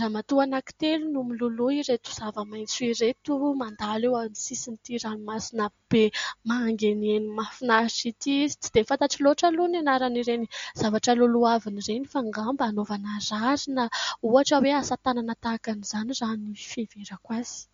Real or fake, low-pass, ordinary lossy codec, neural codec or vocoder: real; 7.2 kHz; MP3, 48 kbps; none